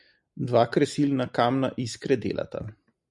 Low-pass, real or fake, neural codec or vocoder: 10.8 kHz; real; none